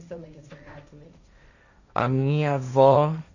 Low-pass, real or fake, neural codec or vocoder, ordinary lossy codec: 7.2 kHz; fake; codec, 16 kHz, 1.1 kbps, Voila-Tokenizer; none